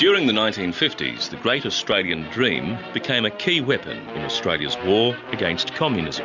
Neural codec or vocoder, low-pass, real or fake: none; 7.2 kHz; real